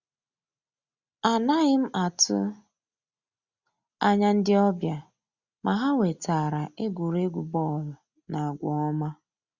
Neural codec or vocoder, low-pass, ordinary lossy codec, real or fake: none; 7.2 kHz; Opus, 64 kbps; real